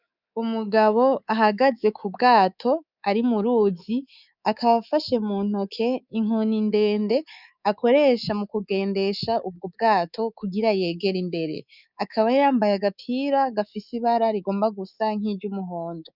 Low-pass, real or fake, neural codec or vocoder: 5.4 kHz; fake; codec, 24 kHz, 3.1 kbps, DualCodec